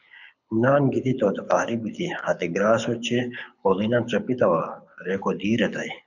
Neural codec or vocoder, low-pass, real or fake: codec, 24 kHz, 6 kbps, HILCodec; 7.2 kHz; fake